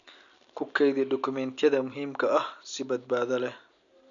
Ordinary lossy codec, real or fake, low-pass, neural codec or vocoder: none; real; 7.2 kHz; none